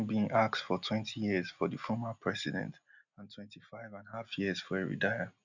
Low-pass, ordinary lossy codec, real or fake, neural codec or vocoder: 7.2 kHz; none; real; none